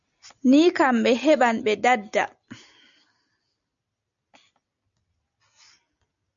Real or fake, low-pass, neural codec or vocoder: real; 7.2 kHz; none